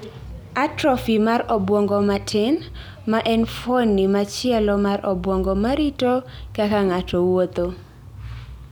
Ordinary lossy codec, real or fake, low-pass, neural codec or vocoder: none; real; none; none